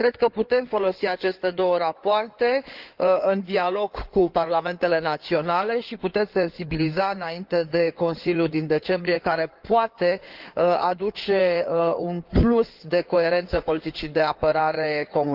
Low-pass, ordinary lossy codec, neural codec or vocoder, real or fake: 5.4 kHz; Opus, 32 kbps; codec, 16 kHz in and 24 kHz out, 2.2 kbps, FireRedTTS-2 codec; fake